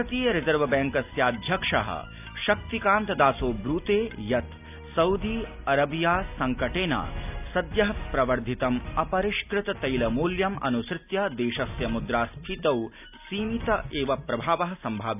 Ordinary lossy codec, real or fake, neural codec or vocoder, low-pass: none; real; none; 3.6 kHz